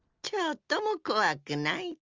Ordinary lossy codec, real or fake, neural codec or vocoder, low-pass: Opus, 32 kbps; real; none; 7.2 kHz